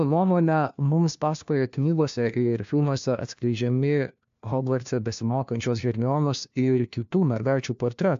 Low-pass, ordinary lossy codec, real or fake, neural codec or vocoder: 7.2 kHz; AAC, 64 kbps; fake; codec, 16 kHz, 1 kbps, FunCodec, trained on LibriTTS, 50 frames a second